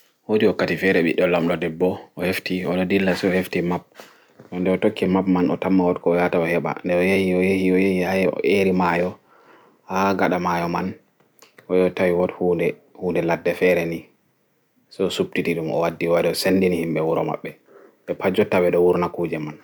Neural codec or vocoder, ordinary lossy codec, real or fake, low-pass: none; none; real; none